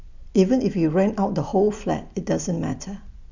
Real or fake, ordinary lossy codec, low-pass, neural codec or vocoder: fake; none; 7.2 kHz; vocoder, 44.1 kHz, 128 mel bands every 256 samples, BigVGAN v2